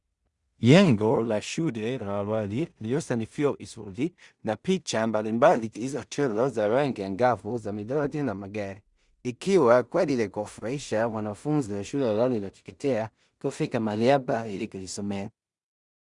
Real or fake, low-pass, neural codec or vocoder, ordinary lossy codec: fake; 10.8 kHz; codec, 16 kHz in and 24 kHz out, 0.4 kbps, LongCat-Audio-Codec, two codebook decoder; Opus, 64 kbps